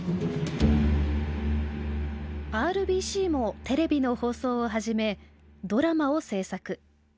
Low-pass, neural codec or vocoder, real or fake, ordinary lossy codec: none; none; real; none